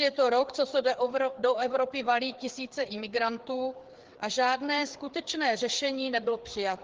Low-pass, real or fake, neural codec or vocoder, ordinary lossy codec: 7.2 kHz; fake; codec, 16 kHz, 4 kbps, FreqCodec, larger model; Opus, 16 kbps